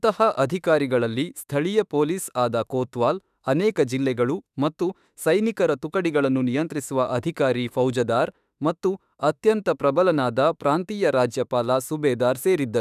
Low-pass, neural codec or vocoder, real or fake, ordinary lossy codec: 14.4 kHz; autoencoder, 48 kHz, 32 numbers a frame, DAC-VAE, trained on Japanese speech; fake; none